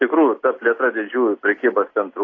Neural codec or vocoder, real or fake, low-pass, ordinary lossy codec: none; real; 7.2 kHz; AAC, 32 kbps